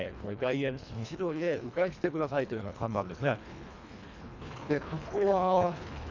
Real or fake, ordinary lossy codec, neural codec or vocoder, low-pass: fake; none; codec, 24 kHz, 1.5 kbps, HILCodec; 7.2 kHz